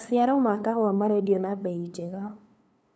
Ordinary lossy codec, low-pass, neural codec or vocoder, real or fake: none; none; codec, 16 kHz, 2 kbps, FunCodec, trained on LibriTTS, 25 frames a second; fake